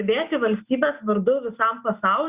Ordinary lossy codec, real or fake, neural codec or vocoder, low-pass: Opus, 64 kbps; real; none; 3.6 kHz